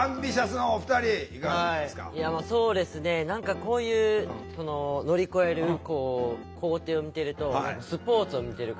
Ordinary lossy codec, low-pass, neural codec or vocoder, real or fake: none; none; none; real